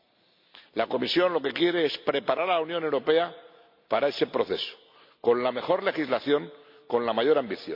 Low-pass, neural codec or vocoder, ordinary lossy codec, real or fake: 5.4 kHz; none; MP3, 48 kbps; real